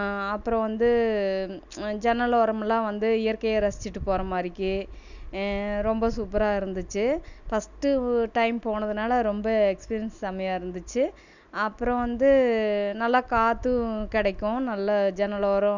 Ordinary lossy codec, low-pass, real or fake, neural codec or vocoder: none; 7.2 kHz; real; none